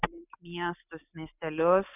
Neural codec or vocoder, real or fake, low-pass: none; real; 3.6 kHz